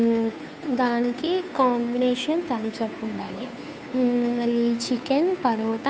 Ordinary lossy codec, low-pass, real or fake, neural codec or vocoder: none; none; fake; codec, 16 kHz, 2 kbps, FunCodec, trained on Chinese and English, 25 frames a second